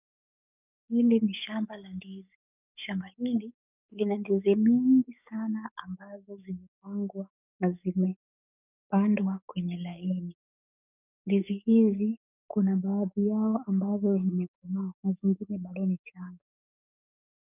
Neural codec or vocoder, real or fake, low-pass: codec, 44.1 kHz, 7.8 kbps, DAC; fake; 3.6 kHz